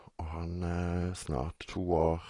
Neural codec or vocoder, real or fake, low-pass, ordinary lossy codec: none; real; 10.8 kHz; AAC, 32 kbps